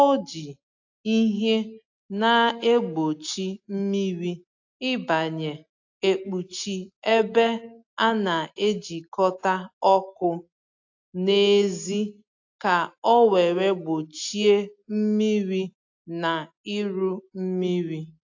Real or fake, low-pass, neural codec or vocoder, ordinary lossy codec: real; 7.2 kHz; none; AAC, 48 kbps